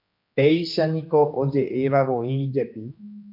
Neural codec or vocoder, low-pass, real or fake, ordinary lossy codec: codec, 16 kHz, 2 kbps, X-Codec, HuBERT features, trained on general audio; 5.4 kHz; fake; MP3, 48 kbps